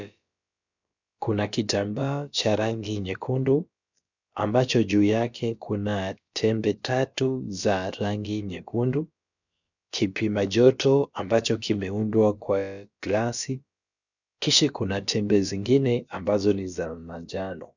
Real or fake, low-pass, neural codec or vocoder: fake; 7.2 kHz; codec, 16 kHz, about 1 kbps, DyCAST, with the encoder's durations